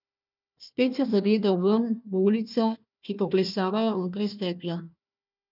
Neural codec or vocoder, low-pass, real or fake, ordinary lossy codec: codec, 16 kHz, 1 kbps, FunCodec, trained on Chinese and English, 50 frames a second; 5.4 kHz; fake; none